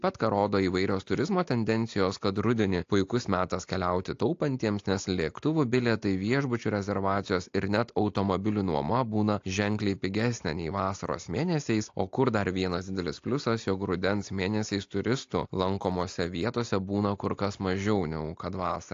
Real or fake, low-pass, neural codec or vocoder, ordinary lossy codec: real; 7.2 kHz; none; AAC, 48 kbps